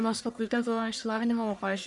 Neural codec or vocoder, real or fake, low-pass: codec, 44.1 kHz, 1.7 kbps, Pupu-Codec; fake; 10.8 kHz